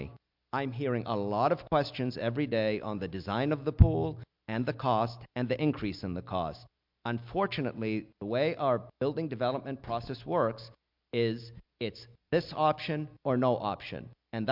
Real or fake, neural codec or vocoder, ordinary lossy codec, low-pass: real; none; MP3, 48 kbps; 5.4 kHz